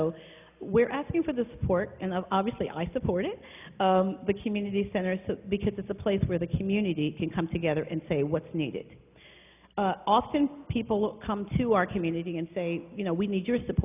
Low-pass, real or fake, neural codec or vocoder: 3.6 kHz; real; none